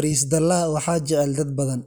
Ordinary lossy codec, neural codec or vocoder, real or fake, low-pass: none; none; real; none